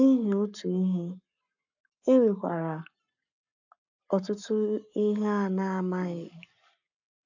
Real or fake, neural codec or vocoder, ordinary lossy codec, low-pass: fake; codec, 44.1 kHz, 7.8 kbps, Pupu-Codec; AAC, 48 kbps; 7.2 kHz